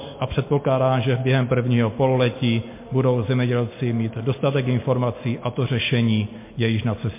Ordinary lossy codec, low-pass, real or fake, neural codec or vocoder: MP3, 24 kbps; 3.6 kHz; real; none